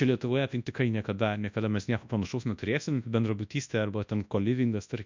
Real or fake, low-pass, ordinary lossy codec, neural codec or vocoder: fake; 7.2 kHz; MP3, 64 kbps; codec, 24 kHz, 0.9 kbps, WavTokenizer, large speech release